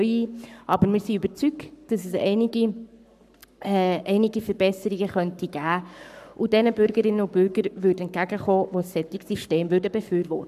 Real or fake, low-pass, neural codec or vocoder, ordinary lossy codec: fake; 14.4 kHz; codec, 44.1 kHz, 7.8 kbps, Pupu-Codec; none